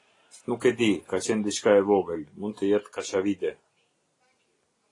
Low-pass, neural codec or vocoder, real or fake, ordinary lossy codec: 10.8 kHz; none; real; AAC, 32 kbps